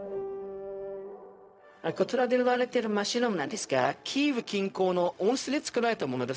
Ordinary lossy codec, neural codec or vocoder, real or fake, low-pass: none; codec, 16 kHz, 0.4 kbps, LongCat-Audio-Codec; fake; none